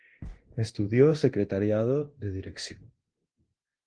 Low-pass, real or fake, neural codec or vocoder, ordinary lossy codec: 9.9 kHz; fake; codec, 24 kHz, 0.9 kbps, DualCodec; Opus, 16 kbps